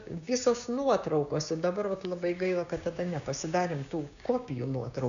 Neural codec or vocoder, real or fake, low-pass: codec, 16 kHz, 6 kbps, DAC; fake; 7.2 kHz